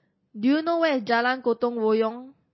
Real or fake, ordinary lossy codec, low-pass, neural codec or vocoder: real; MP3, 24 kbps; 7.2 kHz; none